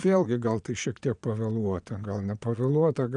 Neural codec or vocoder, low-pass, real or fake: vocoder, 22.05 kHz, 80 mel bands, Vocos; 9.9 kHz; fake